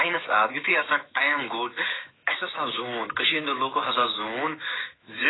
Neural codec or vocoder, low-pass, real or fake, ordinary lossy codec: autoencoder, 48 kHz, 128 numbers a frame, DAC-VAE, trained on Japanese speech; 7.2 kHz; fake; AAC, 16 kbps